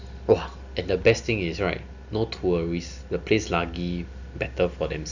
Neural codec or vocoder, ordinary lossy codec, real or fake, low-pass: none; none; real; 7.2 kHz